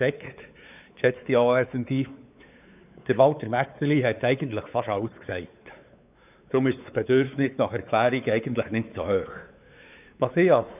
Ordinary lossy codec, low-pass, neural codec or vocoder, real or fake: none; 3.6 kHz; codec, 16 kHz, 4 kbps, X-Codec, WavLM features, trained on Multilingual LibriSpeech; fake